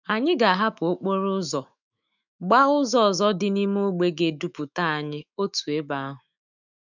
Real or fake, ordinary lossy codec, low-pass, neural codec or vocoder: fake; none; 7.2 kHz; autoencoder, 48 kHz, 128 numbers a frame, DAC-VAE, trained on Japanese speech